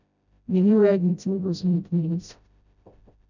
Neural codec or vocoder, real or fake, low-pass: codec, 16 kHz, 0.5 kbps, FreqCodec, smaller model; fake; 7.2 kHz